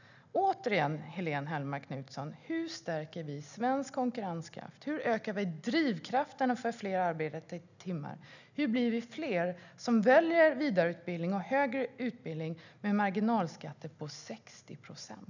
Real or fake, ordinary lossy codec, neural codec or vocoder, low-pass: real; none; none; 7.2 kHz